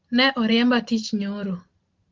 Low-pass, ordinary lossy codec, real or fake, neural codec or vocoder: 7.2 kHz; Opus, 16 kbps; real; none